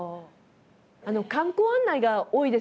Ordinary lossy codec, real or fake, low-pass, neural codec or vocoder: none; real; none; none